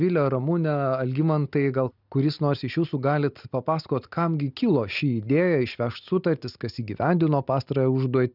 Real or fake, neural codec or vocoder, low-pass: real; none; 5.4 kHz